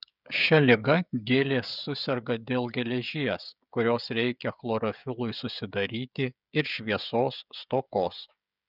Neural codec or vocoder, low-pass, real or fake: codec, 16 kHz, 16 kbps, FreqCodec, smaller model; 5.4 kHz; fake